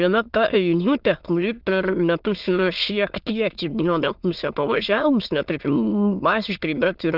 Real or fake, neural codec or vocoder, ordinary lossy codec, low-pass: fake; autoencoder, 22.05 kHz, a latent of 192 numbers a frame, VITS, trained on many speakers; Opus, 32 kbps; 5.4 kHz